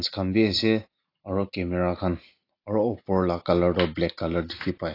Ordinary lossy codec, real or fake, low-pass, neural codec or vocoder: AAC, 32 kbps; real; 5.4 kHz; none